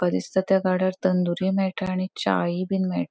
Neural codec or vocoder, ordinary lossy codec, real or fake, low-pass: none; none; real; none